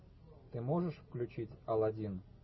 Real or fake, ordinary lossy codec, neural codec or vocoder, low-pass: real; MP3, 24 kbps; none; 7.2 kHz